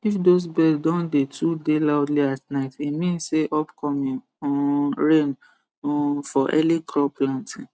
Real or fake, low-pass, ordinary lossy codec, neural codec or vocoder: real; none; none; none